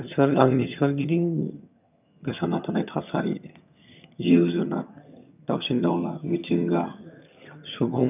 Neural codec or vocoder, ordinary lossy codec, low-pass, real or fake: vocoder, 22.05 kHz, 80 mel bands, HiFi-GAN; none; 3.6 kHz; fake